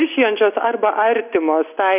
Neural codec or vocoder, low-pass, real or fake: none; 3.6 kHz; real